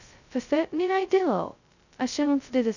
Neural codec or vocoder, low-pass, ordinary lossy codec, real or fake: codec, 16 kHz, 0.2 kbps, FocalCodec; 7.2 kHz; none; fake